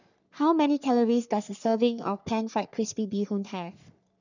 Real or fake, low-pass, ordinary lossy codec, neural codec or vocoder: fake; 7.2 kHz; none; codec, 44.1 kHz, 3.4 kbps, Pupu-Codec